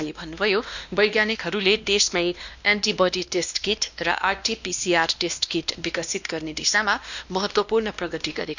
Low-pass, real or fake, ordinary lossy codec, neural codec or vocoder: 7.2 kHz; fake; none; codec, 16 kHz, 1 kbps, X-Codec, WavLM features, trained on Multilingual LibriSpeech